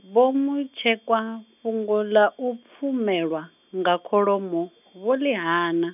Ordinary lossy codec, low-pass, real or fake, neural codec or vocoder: none; 3.6 kHz; real; none